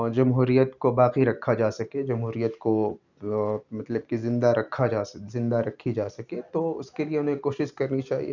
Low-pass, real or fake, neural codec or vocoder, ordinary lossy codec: 7.2 kHz; real; none; none